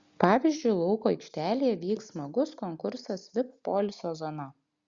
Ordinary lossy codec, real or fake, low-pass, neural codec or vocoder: Opus, 64 kbps; real; 7.2 kHz; none